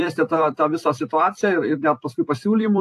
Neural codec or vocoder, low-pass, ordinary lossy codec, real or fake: vocoder, 44.1 kHz, 128 mel bands every 256 samples, BigVGAN v2; 14.4 kHz; AAC, 64 kbps; fake